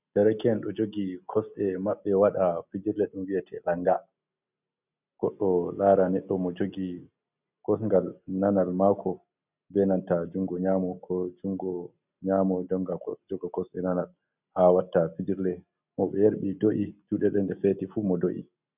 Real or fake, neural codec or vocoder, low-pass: real; none; 3.6 kHz